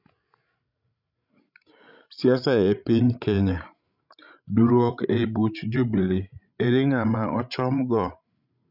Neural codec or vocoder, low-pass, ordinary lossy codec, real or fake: codec, 16 kHz, 16 kbps, FreqCodec, larger model; 5.4 kHz; none; fake